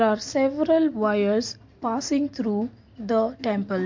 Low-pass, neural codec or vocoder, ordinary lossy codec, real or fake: 7.2 kHz; none; MP3, 48 kbps; real